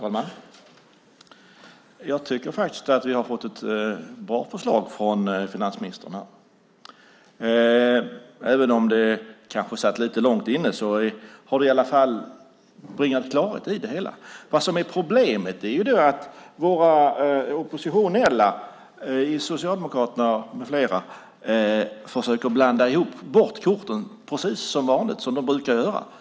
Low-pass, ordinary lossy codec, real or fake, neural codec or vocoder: none; none; real; none